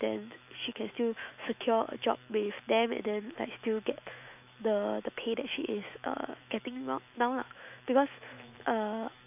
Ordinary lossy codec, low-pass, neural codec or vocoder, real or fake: none; 3.6 kHz; autoencoder, 48 kHz, 128 numbers a frame, DAC-VAE, trained on Japanese speech; fake